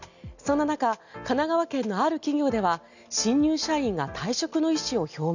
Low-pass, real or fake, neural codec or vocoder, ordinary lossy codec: 7.2 kHz; real; none; none